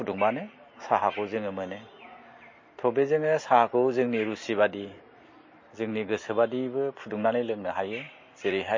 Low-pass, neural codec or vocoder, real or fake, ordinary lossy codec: 7.2 kHz; none; real; MP3, 32 kbps